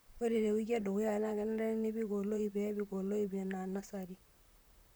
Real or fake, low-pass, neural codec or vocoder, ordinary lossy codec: fake; none; vocoder, 44.1 kHz, 128 mel bands, Pupu-Vocoder; none